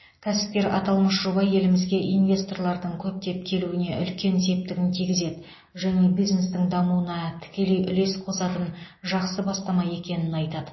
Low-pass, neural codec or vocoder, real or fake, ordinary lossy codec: 7.2 kHz; none; real; MP3, 24 kbps